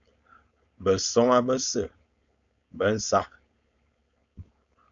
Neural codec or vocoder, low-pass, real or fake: codec, 16 kHz, 4.8 kbps, FACodec; 7.2 kHz; fake